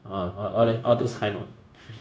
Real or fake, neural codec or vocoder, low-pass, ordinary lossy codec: fake; codec, 16 kHz, 0.9 kbps, LongCat-Audio-Codec; none; none